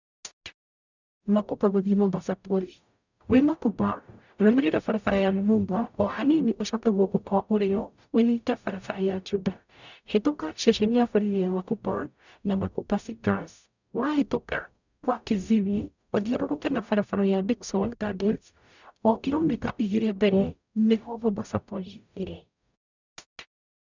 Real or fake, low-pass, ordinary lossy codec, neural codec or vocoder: fake; 7.2 kHz; none; codec, 44.1 kHz, 0.9 kbps, DAC